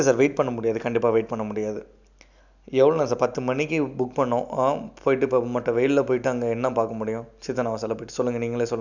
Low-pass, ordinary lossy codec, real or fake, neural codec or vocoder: 7.2 kHz; none; real; none